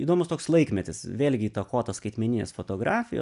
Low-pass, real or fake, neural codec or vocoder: 10.8 kHz; real; none